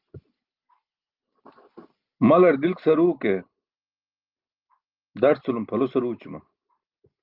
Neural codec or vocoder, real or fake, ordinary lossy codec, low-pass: none; real; Opus, 32 kbps; 5.4 kHz